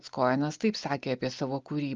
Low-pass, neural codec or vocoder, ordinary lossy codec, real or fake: 7.2 kHz; none; Opus, 24 kbps; real